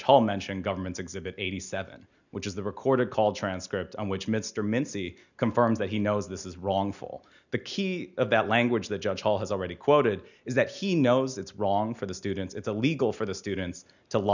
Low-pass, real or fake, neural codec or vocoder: 7.2 kHz; real; none